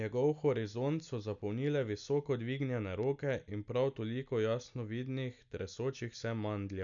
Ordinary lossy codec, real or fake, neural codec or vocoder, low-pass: none; real; none; 7.2 kHz